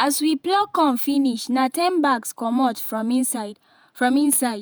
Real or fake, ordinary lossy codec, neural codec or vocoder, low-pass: fake; none; vocoder, 48 kHz, 128 mel bands, Vocos; none